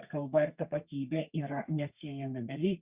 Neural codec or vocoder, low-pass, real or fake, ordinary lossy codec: codec, 32 kHz, 1.9 kbps, SNAC; 3.6 kHz; fake; Opus, 64 kbps